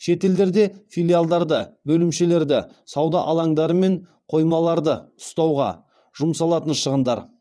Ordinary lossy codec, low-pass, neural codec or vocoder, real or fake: none; none; vocoder, 22.05 kHz, 80 mel bands, WaveNeXt; fake